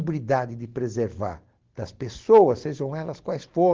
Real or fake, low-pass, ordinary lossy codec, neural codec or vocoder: real; 7.2 kHz; Opus, 16 kbps; none